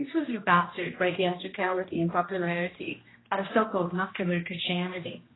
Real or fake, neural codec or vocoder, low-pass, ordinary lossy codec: fake; codec, 16 kHz, 1 kbps, X-Codec, HuBERT features, trained on general audio; 7.2 kHz; AAC, 16 kbps